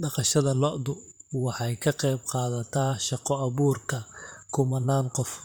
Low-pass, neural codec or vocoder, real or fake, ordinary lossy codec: none; vocoder, 44.1 kHz, 128 mel bands, Pupu-Vocoder; fake; none